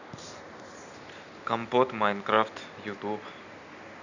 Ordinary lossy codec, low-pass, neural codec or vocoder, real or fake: none; 7.2 kHz; none; real